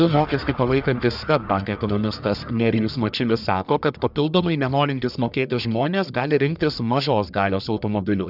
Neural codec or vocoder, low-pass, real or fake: codec, 44.1 kHz, 1.7 kbps, Pupu-Codec; 5.4 kHz; fake